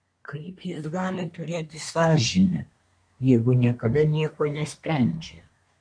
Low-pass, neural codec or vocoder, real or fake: 9.9 kHz; codec, 24 kHz, 1 kbps, SNAC; fake